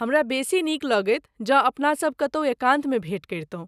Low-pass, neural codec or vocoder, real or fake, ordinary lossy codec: 14.4 kHz; none; real; none